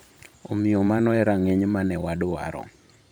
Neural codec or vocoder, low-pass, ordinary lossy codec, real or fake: vocoder, 44.1 kHz, 128 mel bands every 512 samples, BigVGAN v2; none; none; fake